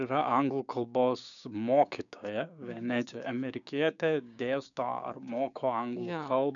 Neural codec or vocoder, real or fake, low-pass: codec, 16 kHz, 6 kbps, DAC; fake; 7.2 kHz